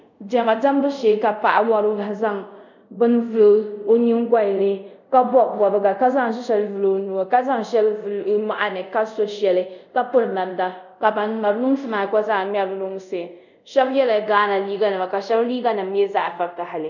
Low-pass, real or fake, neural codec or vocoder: 7.2 kHz; fake; codec, 24 kHz, 0.5 kbps, DualCodec